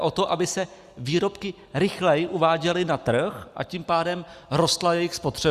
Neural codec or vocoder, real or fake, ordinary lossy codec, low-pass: none; real; Opus, 64 kbps; 14.4 kHz